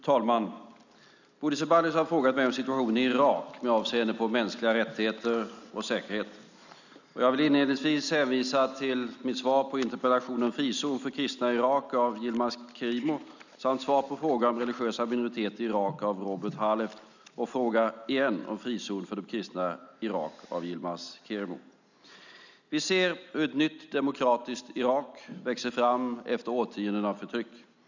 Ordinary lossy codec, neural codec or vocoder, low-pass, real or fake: none; none; 7.2 kHz; real